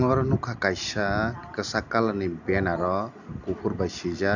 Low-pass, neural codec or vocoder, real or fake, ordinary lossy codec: 7.2 kHz; none; real; none